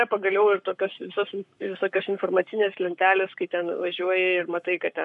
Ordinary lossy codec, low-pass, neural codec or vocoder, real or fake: MP3, 64 kbps; 10.8 kHz; codec, 44.1 kHz, 7.8 kbps, Pupu-Codec; fake